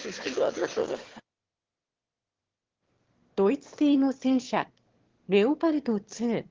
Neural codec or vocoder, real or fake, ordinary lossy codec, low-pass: autoencoder, 22.05 kHz, a latent of 192 numbers a frame, VITS, trained on one speaker; fake; Opus, 16 kbps; 7.2 kHz